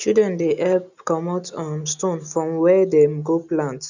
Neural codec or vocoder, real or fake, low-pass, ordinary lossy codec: none; real; 7.2 kHz; none